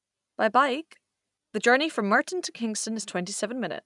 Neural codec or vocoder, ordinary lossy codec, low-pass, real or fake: none; none; 10.8 kHz; real